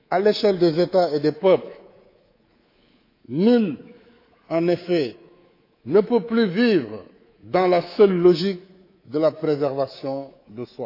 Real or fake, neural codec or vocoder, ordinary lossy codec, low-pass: fake; codec, 24 kHz, 3.1 kbps, DualCodec; AAC, 32 kbps; 5.4 kHz